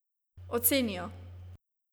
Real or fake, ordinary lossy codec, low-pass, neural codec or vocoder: real; none; none; none